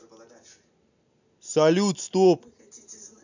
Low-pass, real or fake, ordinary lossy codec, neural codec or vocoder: 7.2 kHz; real; none; none